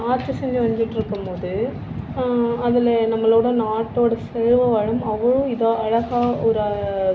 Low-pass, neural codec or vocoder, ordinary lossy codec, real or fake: none; none; none; real